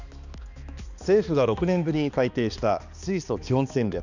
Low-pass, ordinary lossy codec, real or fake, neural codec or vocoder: 7.2 kHz; none; fake; codec, 16 kHz, 2 kbps, X-Codec, HuBERT features, trained on balanced general audio